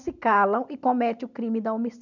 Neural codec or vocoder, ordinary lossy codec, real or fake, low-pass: none; none; real; 7.2 kHz